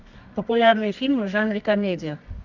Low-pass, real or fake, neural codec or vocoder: 7.2 kHz; fake; codec, 24 kHz, 0.9 kbps, WavTokenizer, medium music audio release